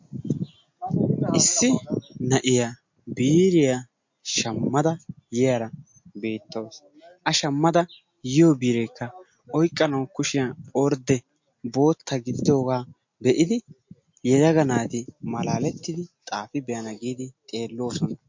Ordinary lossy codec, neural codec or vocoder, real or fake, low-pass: MP3, 48 kbps; none; real; 7.2 kHz